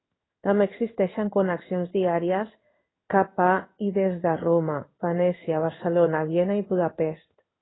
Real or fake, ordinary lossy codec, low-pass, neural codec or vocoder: fake; AAC, 16 kbps; 7.2 kHz; codec, 16 kHz in and 24 kHz out, 1 kbps, XY-Tokenizer